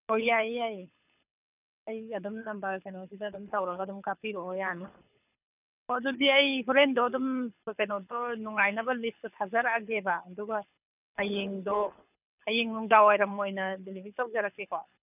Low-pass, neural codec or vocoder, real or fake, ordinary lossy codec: 3.6 kHz; codec, 24 kHz, 6 kbps, HILCodec; fake; none